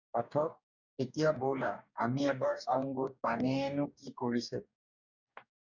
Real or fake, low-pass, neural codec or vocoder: fake; 7.2 kHz; codec, 44.1 kHz, 2.6 kbps, DAC